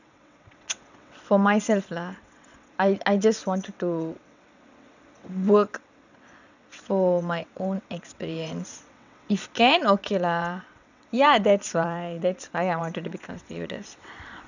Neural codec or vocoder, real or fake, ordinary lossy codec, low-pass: vocoder, 22.05 kHz, 80 mel bands, WaveNeXt; fake; none; 7.2 kHz